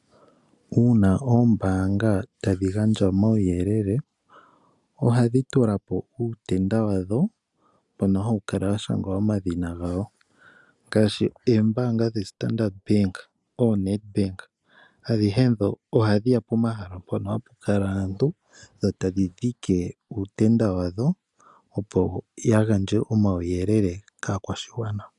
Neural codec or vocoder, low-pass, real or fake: none; 10.8 kHz; real